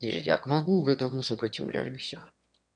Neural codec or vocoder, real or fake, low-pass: autoencoder, 22.05 kHz, a latent of 192 numbers a frame, VITS, trained on one speaker; fake; 9.9 kHz